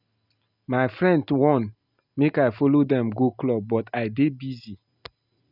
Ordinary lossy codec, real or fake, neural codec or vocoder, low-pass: none; real; none; 5.4 kHz